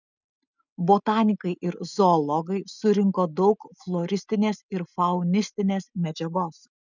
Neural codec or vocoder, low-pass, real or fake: none; 7.2 kHz; real